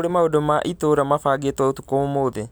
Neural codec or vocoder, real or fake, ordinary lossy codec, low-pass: none; real; none; none